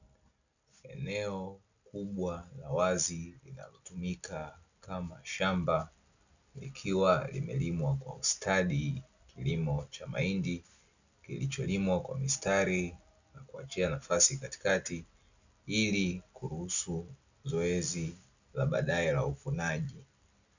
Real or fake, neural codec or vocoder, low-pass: real; none; 7.2 kHz